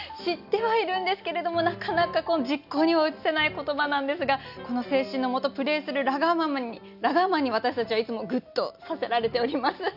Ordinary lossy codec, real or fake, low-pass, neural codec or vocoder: none; real; 5.4 kHz; none